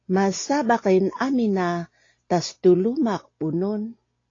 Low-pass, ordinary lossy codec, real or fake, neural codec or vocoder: 7.2 kHz; AAC, 32 kbps; real; none